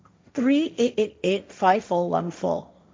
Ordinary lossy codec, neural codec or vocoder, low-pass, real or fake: none; codec, 16 kHz, 1.1 kbps, Voila-Tokenizer; none; fake